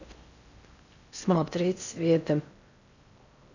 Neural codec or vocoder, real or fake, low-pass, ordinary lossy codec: codec, 16 kHz in and 24 kHz out, 0.6 kbps, FocalCodec, streaming, 4096 codes; fake; 7.2 kHz; none